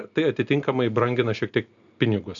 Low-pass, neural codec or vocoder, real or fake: 7.2 kHz; none; real